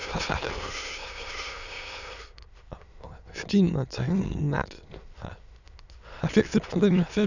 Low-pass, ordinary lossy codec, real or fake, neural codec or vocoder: 7.2 kHz; none; fake; autoencoder, 22.05 kHz, a latent of 192 numbers a frame, VITS, trained on many speakers